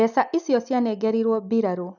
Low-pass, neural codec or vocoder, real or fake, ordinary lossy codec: 7.2 kHz; none; real; none